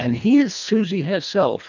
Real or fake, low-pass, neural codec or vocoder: fake; 7.2 kHz; codec, 24 kHz, 1.5 kbps, HILCodec